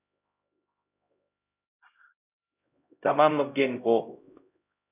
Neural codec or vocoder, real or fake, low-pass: codec, 16 kHz, 0.5 kbps, X-Codec, HuBERT features, trained on LibriSpeech; fake; 3.6 kHz